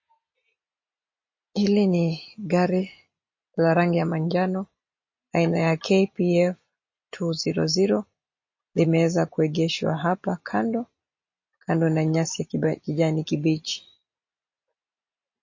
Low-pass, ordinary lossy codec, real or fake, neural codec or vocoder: 7.2 kHz; MP3, 32 kbps; real; none